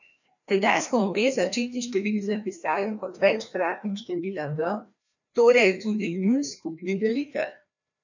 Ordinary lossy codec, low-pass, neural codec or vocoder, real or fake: none; 7.2 kHz; codec, 16 kHz, 1 kbps, FreqCodec, larger model; fake